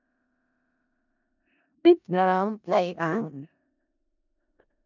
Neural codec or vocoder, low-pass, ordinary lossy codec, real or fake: codec, 16 kHz in and 24 kHz out, 0.4 kbps, LongCat-Audio-Codec, four codebook decoder; 7.2 kHz; none; fake